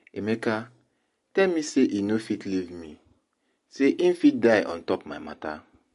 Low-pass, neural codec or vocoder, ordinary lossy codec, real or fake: 14.4 kHz; none; MP3, 48 kbps; real